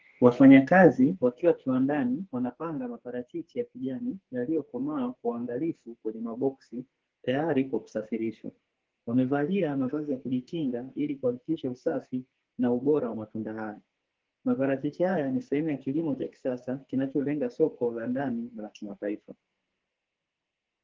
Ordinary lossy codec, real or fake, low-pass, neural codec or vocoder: Opus, 16 kbps; fake; 7.2 kHz; codec, 44.1 kHz, 2.6 kbps, SNAC